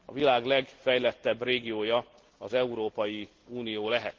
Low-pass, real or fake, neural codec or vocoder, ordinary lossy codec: 7.2 kHz; real; none; Opus, 16 kbps